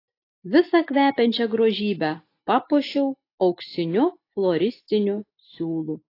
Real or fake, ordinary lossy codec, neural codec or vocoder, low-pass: real; AAC, 32 kbps; none; 5.4 kHz